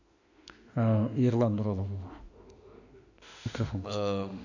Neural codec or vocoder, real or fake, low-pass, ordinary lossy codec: autoencoder, 48 kHz, 32 numbers a frame, DAC-VAE, trained on Japanese speech; fake; 7.2 kHz; none